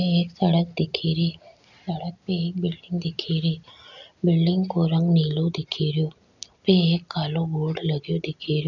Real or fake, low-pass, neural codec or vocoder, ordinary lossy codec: real; 7.2 kHz; none; none